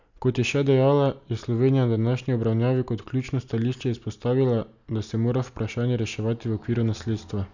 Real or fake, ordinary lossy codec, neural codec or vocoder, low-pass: real; none; none; 7.2 kHz